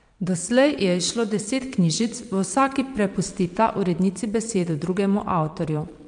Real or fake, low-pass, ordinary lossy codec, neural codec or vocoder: fake; 9.9 kHz; MP3, 64 kbps; vocoder, 22.05 kHz, 80 mel bands, Vocos